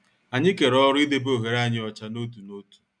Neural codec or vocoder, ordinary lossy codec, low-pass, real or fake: none; none; 9.9 kHz; real